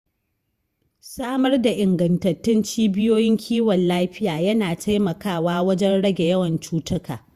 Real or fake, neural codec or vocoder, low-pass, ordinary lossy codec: fake; vocoder, 48 kHz, 128 mel bands, Vocos; 14.4 kHz; Opus, 64 kbps